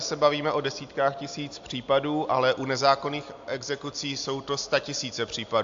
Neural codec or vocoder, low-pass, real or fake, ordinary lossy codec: none; 7.2 kHz; real; AAC, 64 kbps